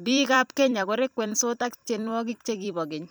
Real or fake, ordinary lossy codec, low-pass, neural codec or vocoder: fake; none; none; vocoder, 44.1 kHz, 128 mel bands every 256 samples, BigVGAN v2